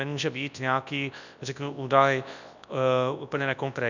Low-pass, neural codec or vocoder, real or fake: 7.2 kHz; codec, 24 kHz, 0.9 kbps, WavTokenizer, large speech release; fake